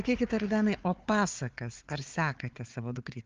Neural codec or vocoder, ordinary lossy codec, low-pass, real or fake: codec, 16 kHz, 4 kbps, FunCodec, trained on Chinese and English, 50 frames a second; Opus, 32 kbps; 7.2 kHz; fake